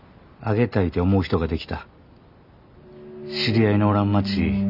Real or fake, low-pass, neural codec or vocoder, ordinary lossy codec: real; 5.4 kHz; none; none